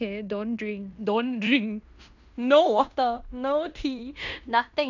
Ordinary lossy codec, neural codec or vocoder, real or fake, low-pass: none; codec, 16 kHz in and 24 kHz out, 0.9 kbps, LongCat-Audio-Codec, fine tuned four codebook decoder; fake; 7.2 kHz